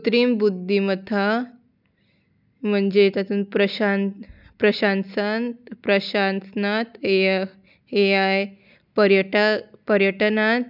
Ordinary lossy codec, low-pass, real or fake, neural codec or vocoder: none; 5.4 kHz; real; none